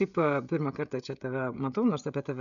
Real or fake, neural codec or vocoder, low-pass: fake; codec, 16 kHz, 16 kbps, FreqCodec, smaller model; 7.2 kHz